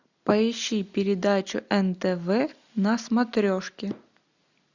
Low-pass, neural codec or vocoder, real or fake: 7.2 kHz; none; real